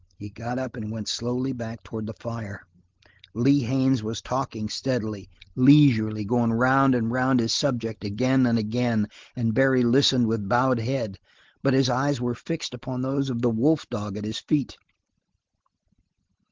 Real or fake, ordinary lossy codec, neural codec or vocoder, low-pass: real; Opus, 16 kbps; none; 7.2 kHz